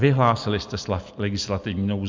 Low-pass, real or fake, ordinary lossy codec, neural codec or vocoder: 7.2 kHz; real; MP3, 64 kbps; none